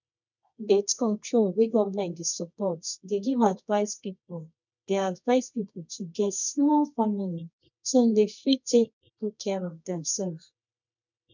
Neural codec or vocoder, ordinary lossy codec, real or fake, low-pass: codec, 24 kHz, 0.9 kbps, WavTokenizer, medium music audio release; none; fake; 7.2 kHz